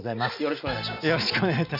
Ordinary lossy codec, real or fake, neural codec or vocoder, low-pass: none; real; none; 5.4 kHz